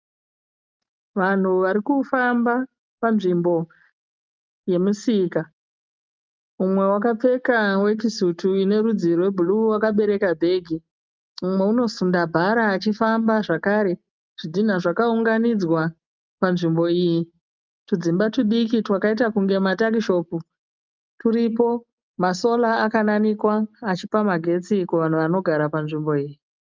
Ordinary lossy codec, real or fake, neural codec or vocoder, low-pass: Opus, 24 kbps; real; none; 7.2 kHz